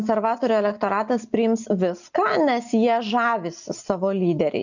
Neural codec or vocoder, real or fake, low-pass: none; real; 7.2 kHz